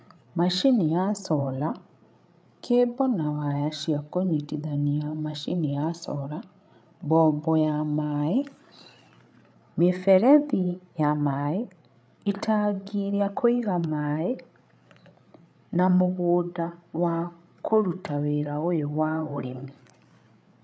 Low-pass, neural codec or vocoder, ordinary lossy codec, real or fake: none; codec, 16 kHz, 8 kbps, FreqCodec, larger model; none; fake